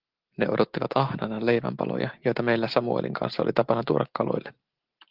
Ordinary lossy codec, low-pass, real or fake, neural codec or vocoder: Opus, 16 kbps; 5.4 kHz; real; none